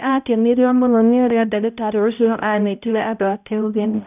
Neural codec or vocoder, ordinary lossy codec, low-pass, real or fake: codec, 16 kHz, 0.5 kbps, X-Codec, HuBERT features, trained on balanced general audio; none; 3.6 kHz; fake